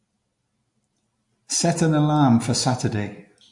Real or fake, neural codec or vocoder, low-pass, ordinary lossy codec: real; none; 10.8 kHz; MP3, 48 kbps